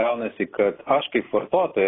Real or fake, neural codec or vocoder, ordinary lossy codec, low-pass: real; none; AAC, 16 kbps; 7.2 kHz